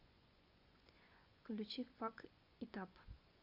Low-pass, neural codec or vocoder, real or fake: 5.4 kHz; vocoder, 24 kHz, 100 mel bands, Vocos; fake